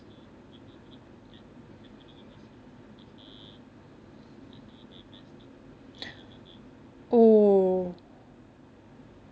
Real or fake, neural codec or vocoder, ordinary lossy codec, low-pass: real; none; none; none